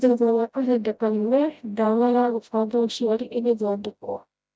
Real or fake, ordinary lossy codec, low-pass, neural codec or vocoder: fake; none; none; codec, 16 kHz, 0.5 kbps, FreqCodec, smaller model